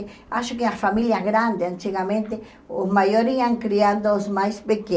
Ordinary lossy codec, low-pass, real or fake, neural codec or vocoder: none; none; real; none